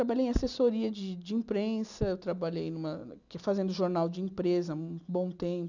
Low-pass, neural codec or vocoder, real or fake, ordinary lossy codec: 7.2 kHz; none; real; none